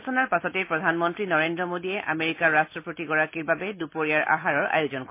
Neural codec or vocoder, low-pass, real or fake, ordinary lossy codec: none; 3.6 kHz; real; MP3, 24 kbps